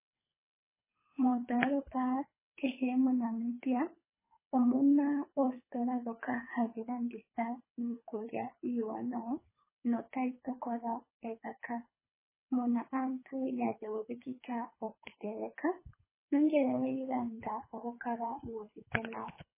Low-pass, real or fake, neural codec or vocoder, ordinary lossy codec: 3.6 kHz; fake; codec, 24 kHz, 3 kbps, HILCodec; MP3, 16 kbps